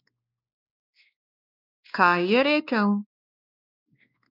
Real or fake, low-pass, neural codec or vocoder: fake; 5.4 kHz; codec, 16 kHz, 2 kbps, X-Codec, WavLM features, trained on Multilingual LibriSpeech